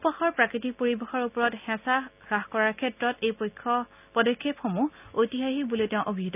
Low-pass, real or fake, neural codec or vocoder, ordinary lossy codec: 3.6 kHz; real; none; none